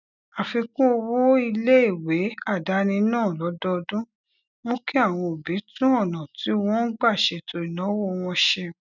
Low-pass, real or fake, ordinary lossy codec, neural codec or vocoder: 7.2 kHz; real; none; none